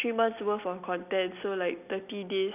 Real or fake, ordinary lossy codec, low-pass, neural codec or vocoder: real; none; 3.6 kHz; none